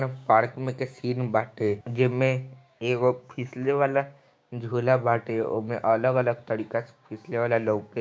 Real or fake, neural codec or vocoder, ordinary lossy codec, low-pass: fake; codec, 16 kHz, 6 kbps, DAC; none; none